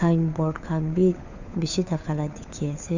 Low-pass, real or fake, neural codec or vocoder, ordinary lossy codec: 7.2 kHz; fake; codec, 16 kHz, 4 kbps, FunCodec, trained on LibriTTS, 50 frames a second; none